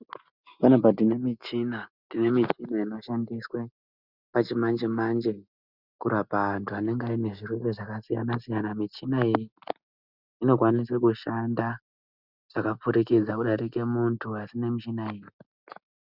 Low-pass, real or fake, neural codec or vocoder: 5.4 kHz; real; none